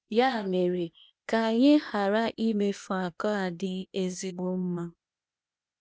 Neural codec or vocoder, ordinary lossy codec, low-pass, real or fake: codec, 16 kHz, 0.8 kbps, ZipCodec; none; none; fake